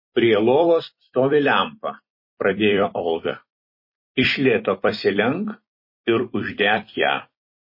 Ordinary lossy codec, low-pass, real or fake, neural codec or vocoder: MP3, 24 kbps; 5.4 kHz; fake; vocoder, 44.1 kHz, 128 mel bands every 256 samples, BigVGAN v2